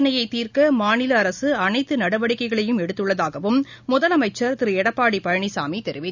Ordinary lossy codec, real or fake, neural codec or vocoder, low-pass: none; real; none; 7.2 kHz